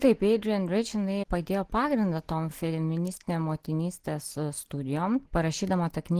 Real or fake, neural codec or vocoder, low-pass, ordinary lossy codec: real; none; 14.4 kHz; Opus, 16 kbps